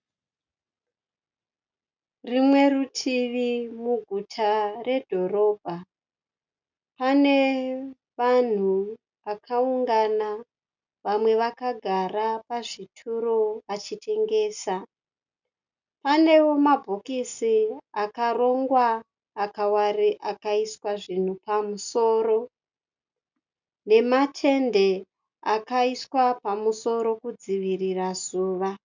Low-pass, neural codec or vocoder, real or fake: 7.2 kHz; none; real